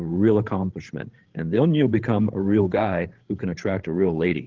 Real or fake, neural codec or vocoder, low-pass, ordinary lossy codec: fake; codec, 16 kHz, 16 kbps, FreqCodec, larger model; 7.2 kHz; Opus, 16 kbps